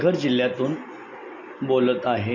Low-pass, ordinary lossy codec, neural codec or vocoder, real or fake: 7.2 kHz; none; none; real